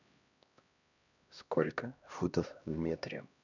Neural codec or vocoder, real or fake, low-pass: codec, 16 kHz, 1 kbps, X-Codec, HuBERT features, trained on LibriSpeech; fake; 7.2 kHz